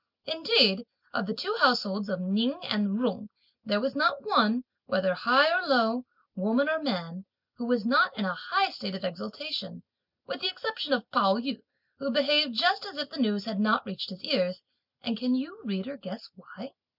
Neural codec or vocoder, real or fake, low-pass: none; real; 5.4 kHz